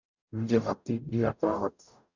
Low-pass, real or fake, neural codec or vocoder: 7.2 kHz; fake; codec, 44.1 kHz, 0.9 kbps, DAC